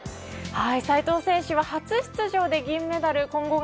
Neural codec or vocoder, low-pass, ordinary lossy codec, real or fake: none; none; none; real